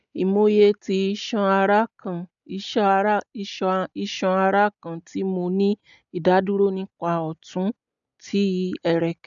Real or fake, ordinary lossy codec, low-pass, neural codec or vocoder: real; none; 7.2 kHz; none